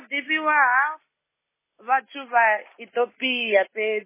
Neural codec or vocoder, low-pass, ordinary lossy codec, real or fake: none; 3.6 kHz; MP3, 16 kbps; real